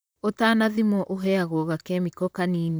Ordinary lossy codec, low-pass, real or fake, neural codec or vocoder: none; none; fake; vocoder, 44.1 kHz, 128 mel bands, Pupu-Vocoder